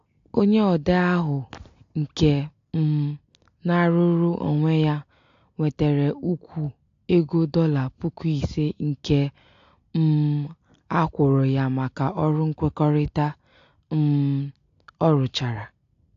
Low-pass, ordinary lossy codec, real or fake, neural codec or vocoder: 7.2 kHz; AAC, 48 kbps; real; none